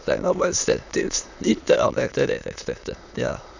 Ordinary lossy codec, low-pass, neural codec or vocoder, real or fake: none; 7.2 kHz; autoencoder, 22.05 kHz, a latent of 192 numbers a frame, VITS, trained on many speakers; fake